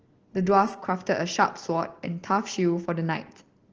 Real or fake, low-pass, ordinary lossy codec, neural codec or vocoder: real; 7.2 kHz; Opus, 16 kbps; none